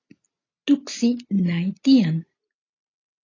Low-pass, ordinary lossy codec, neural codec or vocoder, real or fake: 7.2 kHz; AAC, 32 kbps; none; real